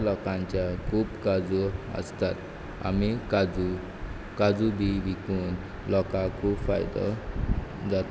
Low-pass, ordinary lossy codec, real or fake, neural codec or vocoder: none; none; real; none